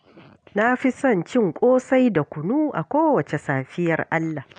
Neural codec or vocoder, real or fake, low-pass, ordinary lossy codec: none; real; 9.9 kHz; none